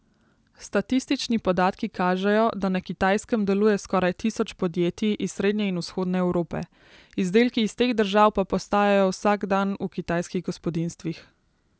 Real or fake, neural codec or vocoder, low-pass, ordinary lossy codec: real; none; none; none